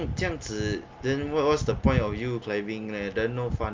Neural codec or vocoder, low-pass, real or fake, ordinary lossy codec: none; 7.2 kHz; real; Opus, 24 kbps